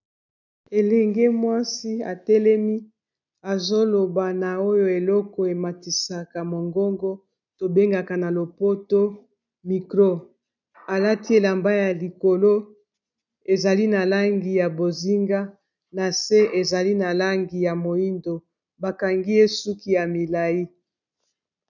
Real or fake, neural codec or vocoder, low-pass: real; none; 7.2 kHz